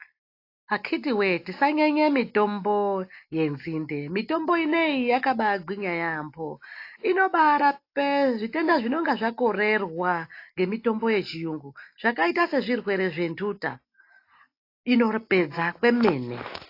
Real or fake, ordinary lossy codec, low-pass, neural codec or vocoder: real; AAC, 32 kbps; 5.4 kHz; none